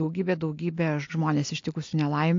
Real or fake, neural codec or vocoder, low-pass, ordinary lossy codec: real; none; 7.2 kHz; AAC, 48 kbps